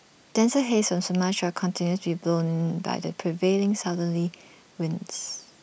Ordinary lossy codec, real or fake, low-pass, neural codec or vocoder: none; real; none; none